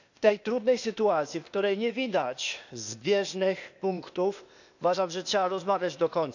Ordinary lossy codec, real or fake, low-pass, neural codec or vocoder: none; fake; 7.2 kHz; codec, 16 kHz, 0.8 kbps, ZipCodec